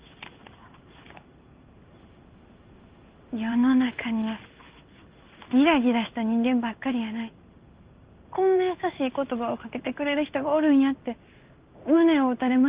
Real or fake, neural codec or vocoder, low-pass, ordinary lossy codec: fake; codec, 16 kHz in and 24 kHz out, 1 kbps, XY-Tokenizer; 3.6 kHz; Opus, 32 kbps